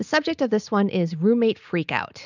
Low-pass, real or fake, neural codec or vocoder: 7.2 kHz; real; none